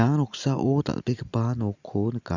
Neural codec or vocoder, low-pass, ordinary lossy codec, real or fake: none; 7.2 kHz; Opus, 64 kbps; real